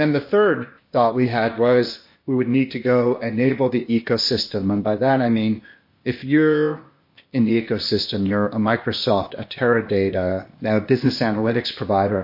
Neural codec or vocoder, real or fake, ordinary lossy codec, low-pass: codec, 16 kHz, 0.8 kbps, ZipCodec; fake; MP3, 32 kbps; 5.4 kHz